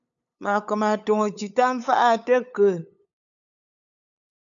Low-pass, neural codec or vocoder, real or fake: 7.2 kHz; codec, 16 kHz, 8 kbps, FunCodec, trained on LibriTTS, 25 frames a second; fake